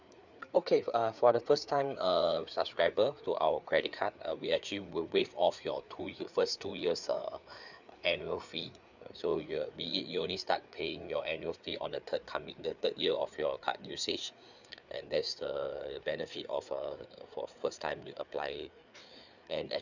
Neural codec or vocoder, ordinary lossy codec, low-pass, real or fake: codec, 16 kHz, 4 kbps, FreqCodec, larger model; none; 7.2 kHz; fake